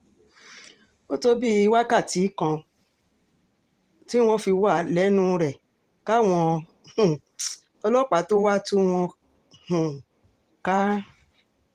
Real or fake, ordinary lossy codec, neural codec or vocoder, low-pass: fake; Opus, 24 kbps; vocoder, 44.1 kHz, 128 mel bands every 512 samples, BigVGAN v2; 14.4 kHz